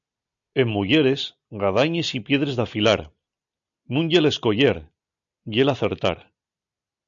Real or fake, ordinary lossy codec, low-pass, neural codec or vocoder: real; MP3, 64 kbps; 7.2 kHz; none